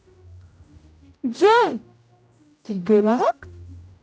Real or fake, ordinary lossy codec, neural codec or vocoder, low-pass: fake; none; codec, 16 kHz, 0.5 kbps, X-Codec, HuBERT features, trained on general audio; none